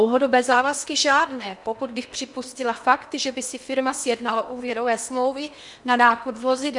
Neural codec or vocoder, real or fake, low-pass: codec, 16 kHz in and 24 kHz out, 0.8 kbps, FocalCodec, streaming, 65536 codes; fake; 10.8 kHz